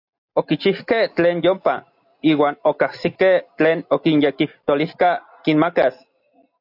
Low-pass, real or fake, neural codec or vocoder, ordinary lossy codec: 5.4 kHz; real; none; AAC, 48 kbps